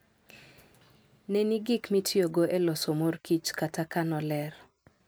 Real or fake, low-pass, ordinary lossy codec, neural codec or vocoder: real; none; none; none